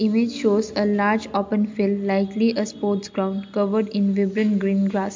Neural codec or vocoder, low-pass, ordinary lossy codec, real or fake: none; 7.2 kHz; MP3, 64 kbps; real